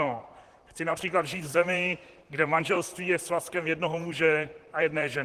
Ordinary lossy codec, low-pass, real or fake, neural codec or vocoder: Opus, 16 kbps; 14.4 kHz; fake; vocoder, 44.1 kHz, 128 mel bands, Pupu-Vocoder